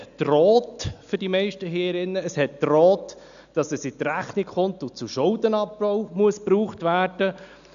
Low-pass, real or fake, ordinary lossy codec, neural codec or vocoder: 7.2 kHz; real; none; none